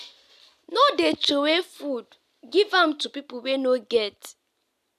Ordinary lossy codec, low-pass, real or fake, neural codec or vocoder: none; 14.4 kHz; real; none